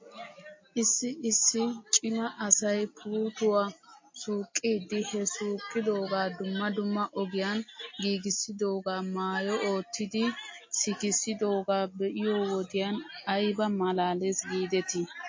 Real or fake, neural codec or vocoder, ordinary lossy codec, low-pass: real; none; MP3, 32 kbps; 7.2 kHz